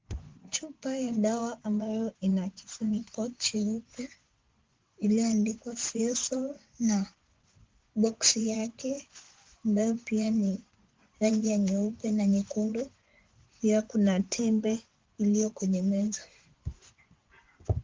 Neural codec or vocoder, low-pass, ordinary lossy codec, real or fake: vocoder, 24 kHz, 100 mel bands, Vocos; 7.2 kHz; Opus, 16 kbps; fake